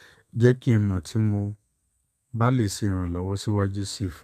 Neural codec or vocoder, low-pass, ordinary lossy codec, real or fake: codec, 32 kHz, 1.9 kbps, SNAC; 14.4 kHz; none; fake